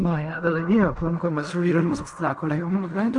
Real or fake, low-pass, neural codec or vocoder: fake; 10.8 kHz; codec, 16 kHz in and 24 kHz out, 0.4 kbps, LongCat-Audio-Codec, fine tuned four codebook decoder